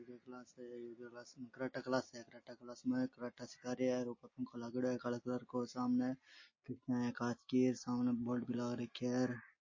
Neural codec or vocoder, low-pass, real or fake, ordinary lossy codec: none; 7.2 kHz; real; MP3, 32 kbps